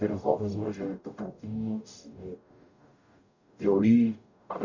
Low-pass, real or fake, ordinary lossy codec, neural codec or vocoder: 7.2 kHz; fake; none; codec, 44.1 kHz, 0.9 kbps, DAC